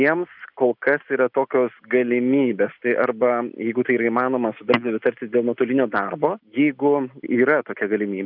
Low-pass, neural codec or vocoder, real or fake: 5.4 kHz; none; real